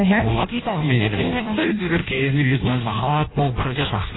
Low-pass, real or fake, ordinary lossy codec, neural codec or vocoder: 7.2 kHz; fake; AAC, 16 kbps; codec, 16 kHz in and 24 kHz out, 0.6 kbps, FireRedTTS-2 codec